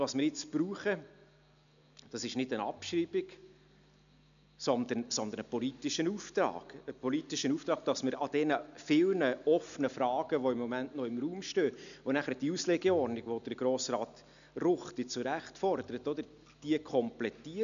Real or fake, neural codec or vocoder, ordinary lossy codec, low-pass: real; none; none; 7.2 kHz